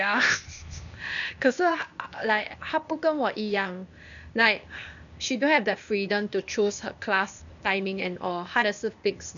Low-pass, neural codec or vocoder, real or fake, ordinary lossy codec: 7.2 kHz; codec, 16 kHz, 0.8 kbps, ZipCodec; fake; none